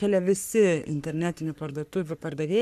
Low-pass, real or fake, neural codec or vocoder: 14.4 kHz; fake; codec, 44.1 kHz, 3.4 kbps, Pupu-Codec